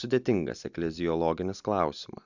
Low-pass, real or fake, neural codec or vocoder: 7.2 kHz; real; none